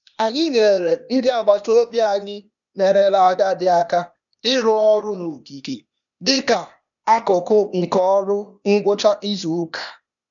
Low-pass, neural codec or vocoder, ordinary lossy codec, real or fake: 7.2 kHz; codec, 16 kHz, 0.8 kbps, ZipCodec; none; fake